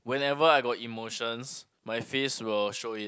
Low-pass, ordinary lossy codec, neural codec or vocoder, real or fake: none; none; none; real